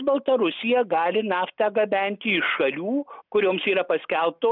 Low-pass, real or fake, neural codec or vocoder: 5.4 kHz; real; none